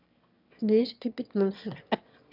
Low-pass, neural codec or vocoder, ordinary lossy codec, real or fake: 5.4 kHz; autoencoder, 22.05 kHz, a latent of 192 numbers a frame, VITS, trained on one speaker; none; fake